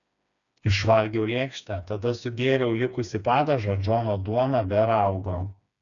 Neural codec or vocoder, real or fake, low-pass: codec, 16 kHz, 2 kbps, FreqCodec, smaller model; fake; 7.2 kHz